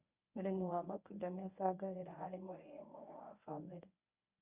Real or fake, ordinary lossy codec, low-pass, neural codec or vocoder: fake; none; 3.6 kHz; codec, 24 kHz, 0.9 kbps, WavTokenizer, medium speech release version 1